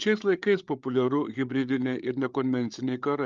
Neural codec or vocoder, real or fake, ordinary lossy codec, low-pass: codec, 16 kHz, 8 kbps, FreqCodec, larger model; fake; Opus, 32 kbps; 7.2 kHz